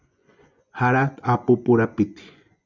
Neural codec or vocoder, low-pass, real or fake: vocoder, 24 kHz, 100 mel bands, Vocos; 7.2 kHz; fake